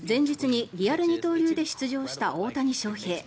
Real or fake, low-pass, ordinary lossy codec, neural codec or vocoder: real; none; none; none